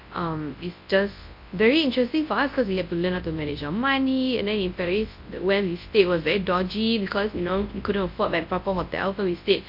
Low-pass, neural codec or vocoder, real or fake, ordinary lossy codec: 5.4 kHz; codec, 24 kHz, 0.9 kbps, WavTokenizer, large speech release; fake; MP3, 32 kbps